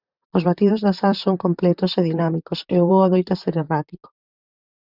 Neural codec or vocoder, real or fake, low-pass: vocoder, 44.1 kHz, 128 mel bands, Pupu-Vocoder; fake; 5.4 kHz